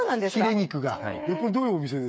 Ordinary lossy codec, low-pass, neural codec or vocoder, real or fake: none; none; codec, 16 kHz, 8 kbps, FreqCodec, smaller model; fake